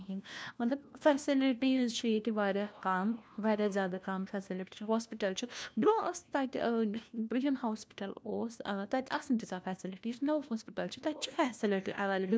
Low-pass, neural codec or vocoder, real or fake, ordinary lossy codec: none; codec, 16 kHz, 1 kbps, FunCodec, trained on LibriTTS, 50 frames a second; fake; none